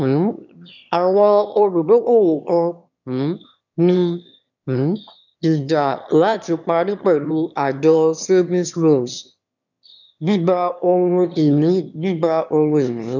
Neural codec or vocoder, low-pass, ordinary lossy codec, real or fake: autoencoder, 22.05 kHz, a latent of 192 numbers a frame, VITS, trained on one speaker; 7.2 kHz; none; fake